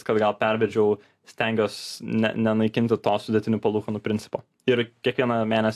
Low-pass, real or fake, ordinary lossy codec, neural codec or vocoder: 14.4 kHz; real; AAC, 64 kbps; none